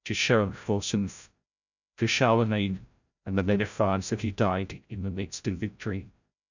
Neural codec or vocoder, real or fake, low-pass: codec, 16 kHz, 0.5 kbps, FreqCodec, larger model; fake; 7.2 kHz